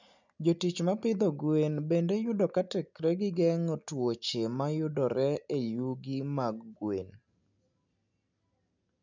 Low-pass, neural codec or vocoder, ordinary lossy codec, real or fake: 7.2 kHz; none; none; real